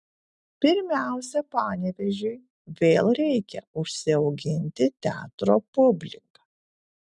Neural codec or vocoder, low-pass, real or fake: none; 10.8 kHz; real